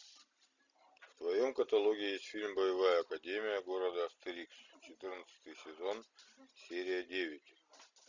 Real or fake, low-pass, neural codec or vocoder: real; 7.2 kHz; none